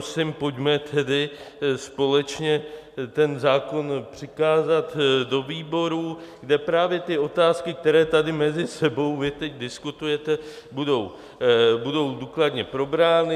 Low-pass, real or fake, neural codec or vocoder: 14.4 kHz; real; none